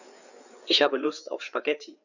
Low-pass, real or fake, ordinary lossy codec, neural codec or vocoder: 7.2 kHz; fake; none; codec, 16 kHz, 4 kbps, FreqCodec, larger model